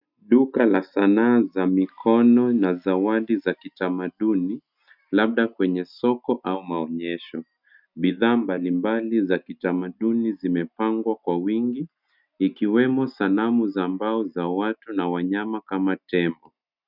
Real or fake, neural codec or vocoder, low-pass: real; none; 5.4 kHz